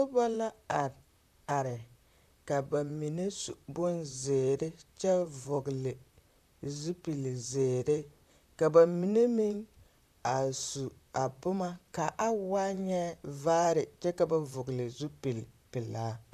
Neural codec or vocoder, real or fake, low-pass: vocoder, 44.1 kHz, 128 mel bands, Pupu-Vocoder; fake; 14.4 kHz